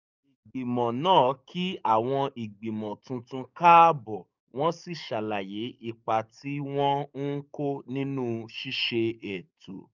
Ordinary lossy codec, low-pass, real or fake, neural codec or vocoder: none; 7.2 kHz; fake; codec, 44.1 kHz, 7.8 kbps, DAC